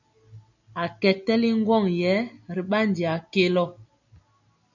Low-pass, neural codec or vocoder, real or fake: 7.2 kHz; none; real